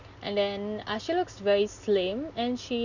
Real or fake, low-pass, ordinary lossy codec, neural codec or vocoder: real; 7.2 kHz; none; none